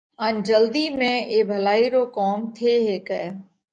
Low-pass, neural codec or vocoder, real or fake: 9.9 kHz; codec, 44.1 kHz, 7.8 kbps, DAC; fake